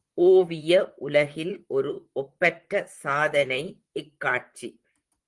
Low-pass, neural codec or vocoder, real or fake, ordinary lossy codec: 10.8 kHz; vocoder, 44.1 kHz, 128 mel bands, Pupu-Vocoder; fake; Opus, 24 kbps